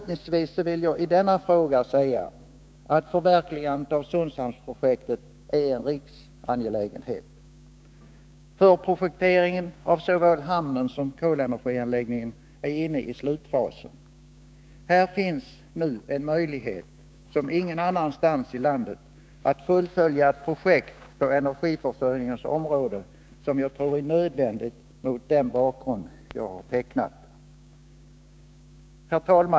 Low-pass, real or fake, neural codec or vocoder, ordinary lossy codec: none; fake; codec, 16 kHz, 6 kbps, DAC; none